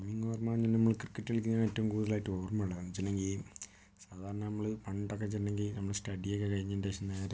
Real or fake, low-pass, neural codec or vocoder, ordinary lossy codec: real; none; none; none